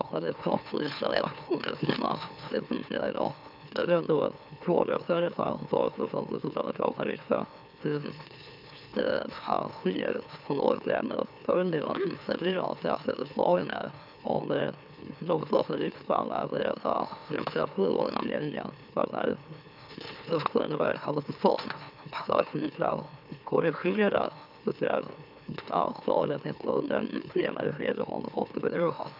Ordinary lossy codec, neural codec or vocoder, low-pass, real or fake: none; autoencoder, 44.1 kHz, a latent of 192 numbers a frame, MeloTTS; 5.4 kHz; fake